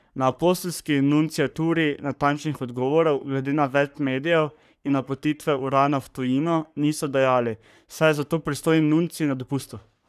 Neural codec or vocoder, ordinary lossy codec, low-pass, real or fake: codec, 44.1 kHz, 3.4 kbps, Pupu-Codec; none; 14.4 kHz; fake